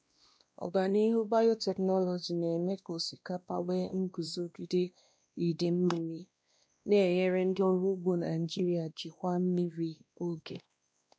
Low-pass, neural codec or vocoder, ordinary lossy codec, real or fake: none; codec, 16 kHz, 1 kbps, X-Codec, WavLM features, trained on Multilingual LibriSpeech; none; fake